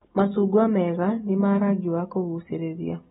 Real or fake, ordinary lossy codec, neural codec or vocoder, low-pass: real; AAC, 16 kbps; none; 7.2 kHz